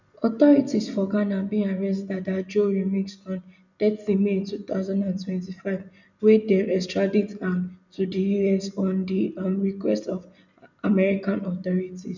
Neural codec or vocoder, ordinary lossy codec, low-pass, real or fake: none; none; 7.2 kHz; real